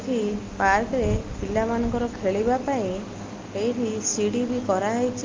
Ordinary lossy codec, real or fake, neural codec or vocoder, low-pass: Opus, 32 kbps; real; none; 7.2 kHz